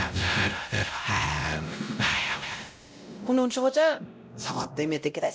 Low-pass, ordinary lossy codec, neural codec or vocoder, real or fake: none; none; codec, 16 kHz, 0.5 kbps, X-Codec, WavLM features, trained on Multilingual LibriSpeech; fake